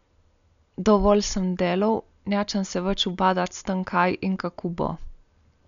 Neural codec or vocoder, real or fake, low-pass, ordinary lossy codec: none; real; 7.2 kHz; none